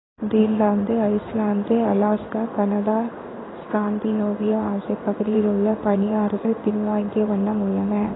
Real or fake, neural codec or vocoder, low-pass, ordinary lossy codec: fake; codec, 16 kHz in and 24 kHz out, 1 kbps, XY-Tokenizer; 7.2 kHz; AAC, 16 kbps